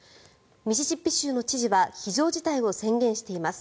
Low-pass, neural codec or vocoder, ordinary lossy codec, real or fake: none; none; none; real